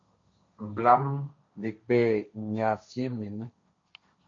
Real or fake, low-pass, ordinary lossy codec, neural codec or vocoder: fake; 7.2 kHz; AAC, 48 kbps; codec, 16 kHz, 1.1 kbps, Voila-Tokenizer